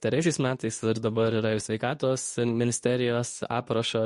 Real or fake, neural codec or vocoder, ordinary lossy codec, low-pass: fake; codec, 24 kHz, 0.9 kbps, WavTokenizer, medium speech release version 2; MP3, 48 kbps; 10.8 kHz